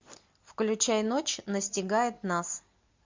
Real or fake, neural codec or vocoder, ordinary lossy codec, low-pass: real; none; MP3, 48 kbps; 7.2 kHz